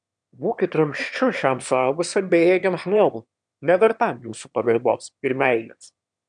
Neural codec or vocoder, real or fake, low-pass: autoencoder, 22.05 kHz, a latent of 192 numbers a frame, VITS, trained on one speaker; fake; 9.9 kHz